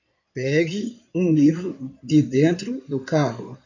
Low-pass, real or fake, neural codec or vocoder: 7.2 kHz; fake; codec, 16 kHz in and 24 kHz out, 2.2 kbps, FireRedTTS-2 codec